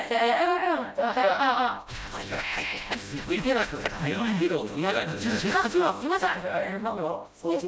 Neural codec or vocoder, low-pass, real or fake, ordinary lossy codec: codec, 16 kHz, 0.5 kbps, FreqCodec, smaller model; none; fake; none